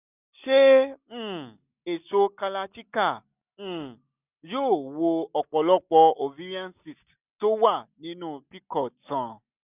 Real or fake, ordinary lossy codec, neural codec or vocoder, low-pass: real; none; none; 3.6 kHz